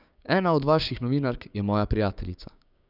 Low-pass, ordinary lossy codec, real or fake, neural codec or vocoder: 5.4 kHz; none; fake; codec, 16 kHz, 6 kbps, DAC